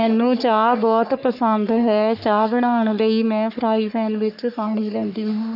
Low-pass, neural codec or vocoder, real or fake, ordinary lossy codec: 5.4 kHz; codec, 44.1 kHz, 3.4 kbps, Pupu-Codec; fake; none